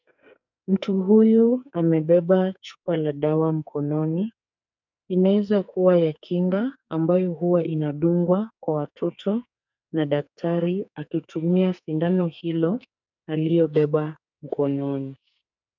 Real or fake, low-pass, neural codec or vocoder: fake; 7.2 kHz; codec, 44.1 kHz, 2.6 kbps, SNAC